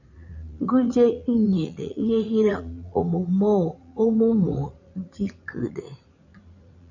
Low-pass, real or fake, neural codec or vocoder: 7.2 kHz; fake; vocoder, 44.1 kHz, 80 mel bands, Vocos